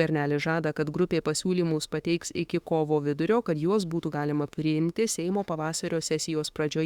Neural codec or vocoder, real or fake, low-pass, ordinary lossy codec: autoencoder, 48 kHz, 32 numbers a frame, DAC-VAE, trained on Japanese speech; fake; 19.8 kHz; Opus, 64 kbps